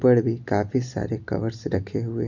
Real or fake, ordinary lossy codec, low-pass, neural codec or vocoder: real; none; 7.2 kHz; none